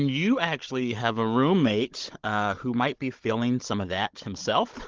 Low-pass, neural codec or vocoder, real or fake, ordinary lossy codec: 7.2 kHz; codec, 16 kHz, 16 kbps, FunCodec, trained on Chinese and English, 50 frames a second; fake; Opus, 16 kbps